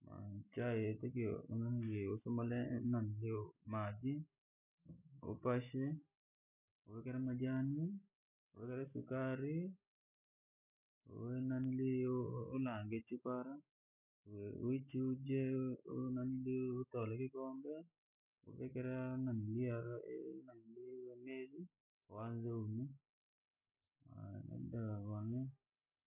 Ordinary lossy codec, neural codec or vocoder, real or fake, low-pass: none; none; real; 3.6 kHz